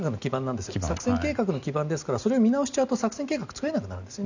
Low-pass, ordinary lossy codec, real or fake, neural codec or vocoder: 7.2 kHz; none; real; none